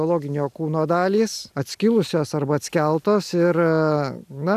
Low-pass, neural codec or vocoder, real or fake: 14.4 kHz; none; real